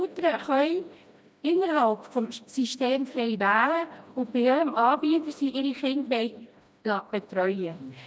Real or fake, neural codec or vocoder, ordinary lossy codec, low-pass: fake; codec, 16 kHz, 1 kbps, FreqCodec, smaller model; none; none